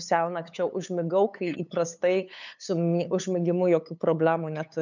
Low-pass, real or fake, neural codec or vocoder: 7.2 kHz; fake; codec, 16 kHz, 8 kbps, FunCodec, trained on LibriTTS, 25 frames a second